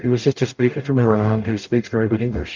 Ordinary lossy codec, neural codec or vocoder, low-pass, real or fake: Opus, 24 kbps; codec, 44.1 kHz, 0.9 kbps, DAC; 7.2 kHz; fake